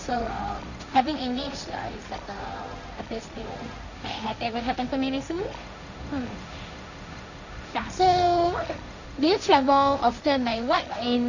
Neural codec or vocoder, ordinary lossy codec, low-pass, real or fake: codec, 16 kHz, 1.1 kbps, Voila-Tokenizer; none; 7.2 kHz; fake